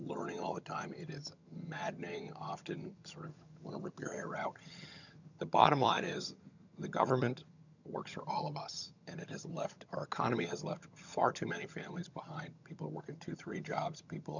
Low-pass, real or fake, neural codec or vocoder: 7.2 kHz; fake; vocoder, 22.05 kHz, 80 mel bands, HiFi-GAN